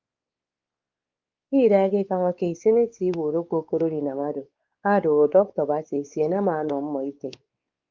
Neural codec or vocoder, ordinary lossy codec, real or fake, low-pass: codec, 16 kHz, 4 kbps, X-Codec, WavLM features, trained on Multilingual LibriSpeech; Opus, 24 kbps; fake; 7.2 kHz